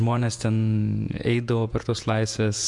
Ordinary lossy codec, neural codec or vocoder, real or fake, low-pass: MP3, 64 kbps; vocoder, 48 kHz, 128 mel bands, Vocos; fake; 10.8 kHz